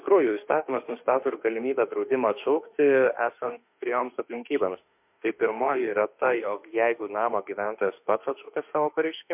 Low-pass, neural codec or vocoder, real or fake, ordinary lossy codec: 3.6 kHz; autoencoder, 48 kHz, 32 numbers a frame, DAC-VAE, trained on Japanese speech; fake; MP3, 32 kbps